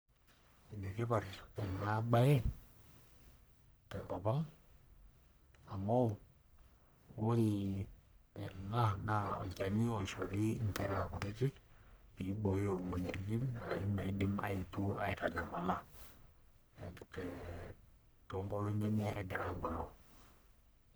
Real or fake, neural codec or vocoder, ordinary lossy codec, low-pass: fake; codec, 44.1 kHz, 1.7 kbps, Pupu-Codec; none; none